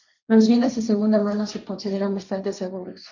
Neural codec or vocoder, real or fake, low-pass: codec, 16 kHz, 1.1 kbps, Voila-Tokenizer; fake; 7.2 kHz